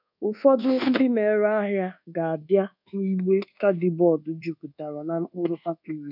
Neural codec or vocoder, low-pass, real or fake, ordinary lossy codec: codec, 24 kHz, 1.2 kbps, DualCodec; 5.4 kHz; fake; none